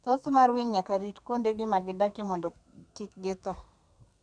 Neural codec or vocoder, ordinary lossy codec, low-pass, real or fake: codec, 32 kHz, 1.9 kbps, SNAC; none; 9.9 kHz; fake